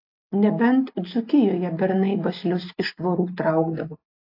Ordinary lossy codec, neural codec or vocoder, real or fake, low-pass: AAC, 32 kbps; none; real; 5.4 kHz